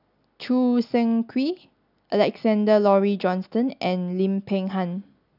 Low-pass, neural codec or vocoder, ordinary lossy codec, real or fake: 5.4 kHz; none; none; real